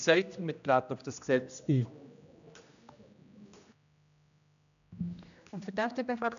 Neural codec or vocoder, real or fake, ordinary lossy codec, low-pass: codec, 16 kHz, 1 kbps, X-Codec, HuBERT features, trained on general audio; fake; none; 7.2 kHz